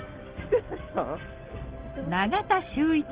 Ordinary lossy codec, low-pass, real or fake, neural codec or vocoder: Opus, 16 kbps; 3.6 kHz; real; none